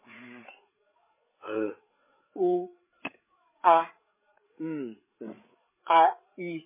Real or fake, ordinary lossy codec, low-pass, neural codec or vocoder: fake; MP3, 16 kbps; 3.6 kHz; codec, 16 kHz, 8 kbps, FreqCodec, larger model